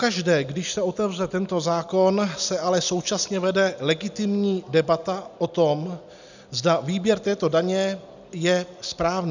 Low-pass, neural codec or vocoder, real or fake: 7.2 kHz; none; real